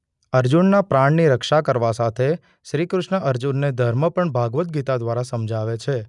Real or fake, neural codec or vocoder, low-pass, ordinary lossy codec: real; none; 10.8 kHz; none